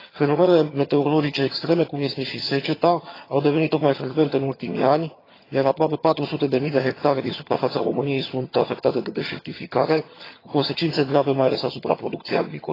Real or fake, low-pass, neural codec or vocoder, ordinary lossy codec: fake; 5.4 kHz; vocoder, 22.05 kHz, 80 mel bands, HiFi-GAN; AAC, 24 kbps